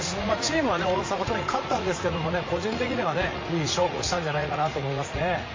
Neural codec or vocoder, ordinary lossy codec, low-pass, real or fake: vocoder, 44.1 kHz, 80 mel bands, Vocos; MP3, 32 kbps; 7.2 kHz; fake